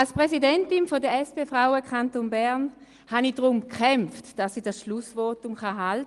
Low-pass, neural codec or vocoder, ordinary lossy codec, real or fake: 10.8 kHz; none; Opus, 24 kbps; real